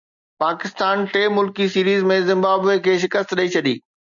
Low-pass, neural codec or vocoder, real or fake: 7.2 kHz; none; real